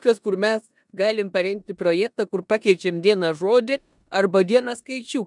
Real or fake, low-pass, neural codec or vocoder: fake; 10.8 kHz; codec, 16 kHz in and 24 kHz out, 0.9 kbps, LongCat-Audio-Codec, four codebook decoder